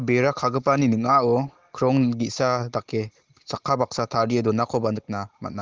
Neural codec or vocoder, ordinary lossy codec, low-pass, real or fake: none; Opus, 16 kbps; 7.2 kHz; real